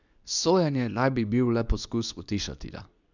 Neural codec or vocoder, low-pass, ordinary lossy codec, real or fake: codec, 24 kHz, 0.9 kbps, WavTokenizer, medium speech release version 2; 7.2 kHz; none; fake